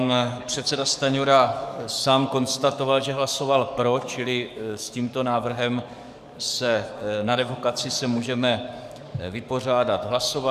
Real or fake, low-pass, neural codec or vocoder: fake; 14.4 kHz; codec, 44.1 kHz, 7.8 kbps, DAC